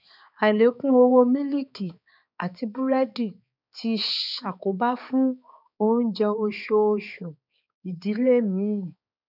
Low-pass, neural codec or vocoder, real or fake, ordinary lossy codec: 5.4 kHz; codec, 16 kHz, 4 kbps, X-Codec, HuBERT features, trained on balanced general audio; fake; none